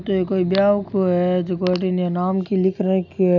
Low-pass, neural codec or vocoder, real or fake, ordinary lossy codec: 7.2 kHz; none; real; none